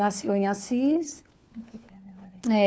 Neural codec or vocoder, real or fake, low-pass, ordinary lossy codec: codec, 16 kHz, 4 kbps, FunCodec, trained on LibriTTS, 50 frames a second; fake; none; none